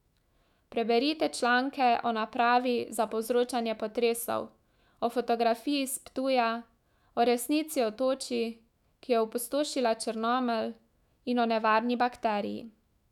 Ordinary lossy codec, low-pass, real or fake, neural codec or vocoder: none; 19.8 kHz; fake; autoencoder, 48 kHz, 128 numbers a frame, DAC-VAE, trained on Japanese speech